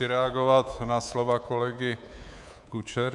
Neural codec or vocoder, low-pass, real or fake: codec, 24 kHz, 3.1 kbps, DualCodec; 10.8 kHz; fake